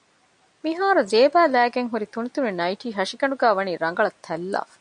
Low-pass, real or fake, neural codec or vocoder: 9.9 kHz; real; none